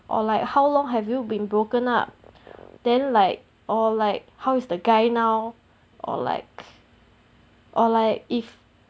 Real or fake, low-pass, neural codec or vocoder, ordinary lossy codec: real; none; none; none